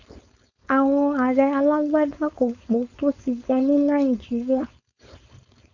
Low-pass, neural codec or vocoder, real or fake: 7.2 kHz; codec, 16 kHz, 4.8 kbps, FACodec; fake